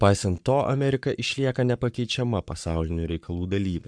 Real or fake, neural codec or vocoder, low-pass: fake; codec, 44.1 kHz, 7.8 kbps, Pupu-Codec; 9.9 kHz